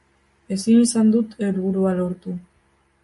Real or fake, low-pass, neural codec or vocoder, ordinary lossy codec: real; 14.4 kHz; none; MP3, 48 kbps